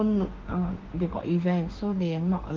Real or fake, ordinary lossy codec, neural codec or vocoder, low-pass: fake; Opus, 16 kbps; autoencoder, 48 kHz, 32 numbers a frame, DAC-VAE, trained on Japanese speech; 7.2 kHz